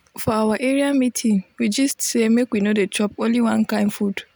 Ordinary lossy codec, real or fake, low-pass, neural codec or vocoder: none; real; none; none